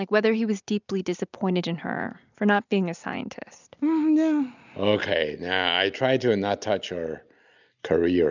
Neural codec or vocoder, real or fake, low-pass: none; real; 7.2 kHz